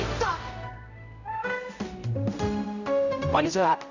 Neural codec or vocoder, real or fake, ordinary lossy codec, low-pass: codec, 16 kHz, 0.5 kbps, X-Codec, HuBERT features, trained on general audio; fake; none; 7.2 kHz